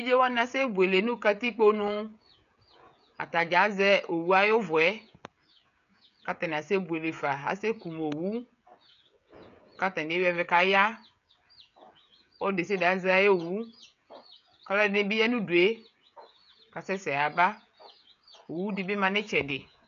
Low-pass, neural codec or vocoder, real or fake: 7.2 kHz; codec, 16 kHz, 16 kbps, FreqCodec, smaller model; fake